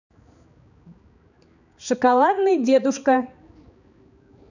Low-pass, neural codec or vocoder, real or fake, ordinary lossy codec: 7.2 kHz; codec, 16 kHz, 4 kbps, X-Codec, HuBERT features, trained on balanced general audio; fake; none